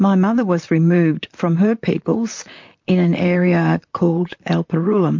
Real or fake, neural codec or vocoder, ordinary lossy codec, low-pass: fake; vocoder, 44.1 kHz, 128 mel bands, Pupu-Vocoder; MP3, 48 kbps; 7.2 kHz